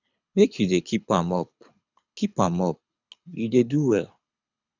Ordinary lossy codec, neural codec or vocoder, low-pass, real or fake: none; codec, 24 kHz, 6 kbps, HILCodec; 7.2 kHz; fake